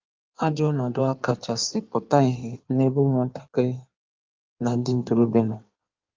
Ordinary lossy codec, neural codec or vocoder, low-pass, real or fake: Opus, 24 kbps; codec, 16 kHz in and 24 kHz out, 1.1 kbps, FireRedTTS-2 codec; 7.2 kHz; fake